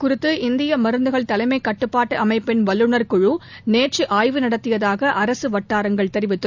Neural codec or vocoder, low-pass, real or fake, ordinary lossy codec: none; 7.2 kHz; real; none